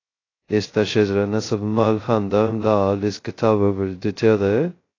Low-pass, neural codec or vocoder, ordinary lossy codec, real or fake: 7.2 kHz; codec, 16 kHz, 0.2 kbps, FocalCodec; AAC, 32 kbps; fake